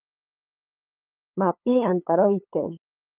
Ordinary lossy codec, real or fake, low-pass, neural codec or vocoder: Opus, 24 kbps; fake; 3.6 kHz; codec, 16 kHz, 8 kbps, FunCodec, trained on LibriTTS, 25 frames a second